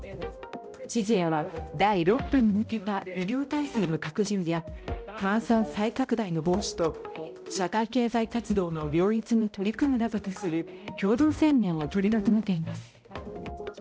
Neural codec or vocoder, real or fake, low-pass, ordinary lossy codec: codec, 16 kHz, 0.5 kbps, X-Codec, HuBERT features, trained on balanced general audio; fake; none; none